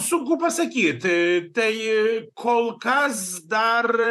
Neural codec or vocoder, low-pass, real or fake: vocoder, 44.1 kHz, 128 mel bands, Pupu-Vocoder; 14.4 kHz; fake